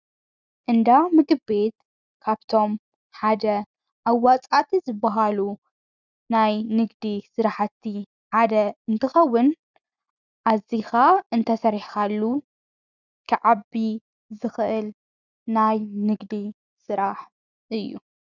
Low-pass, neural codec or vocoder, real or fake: 7.2 kHz; none; real